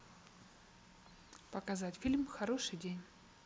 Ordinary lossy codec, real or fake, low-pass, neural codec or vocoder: none; real; none; none